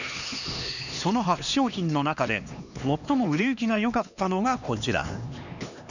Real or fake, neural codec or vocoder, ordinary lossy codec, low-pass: fake; codec, 16 kHz, 2 kbps, X-Codec, HuBERT features, trained on LibriSpeech; none; 7.2 kHz